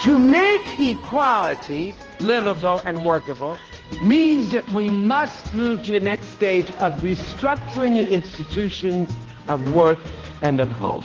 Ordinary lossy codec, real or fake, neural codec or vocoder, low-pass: Opus, 16 kbps; fake; codec, 16 kHz, 1 kbps, X-Codec, HuBERT features, trained on balanced general audio; 7.2 kHz